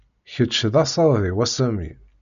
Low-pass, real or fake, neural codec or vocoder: 7.2 kHz; real; none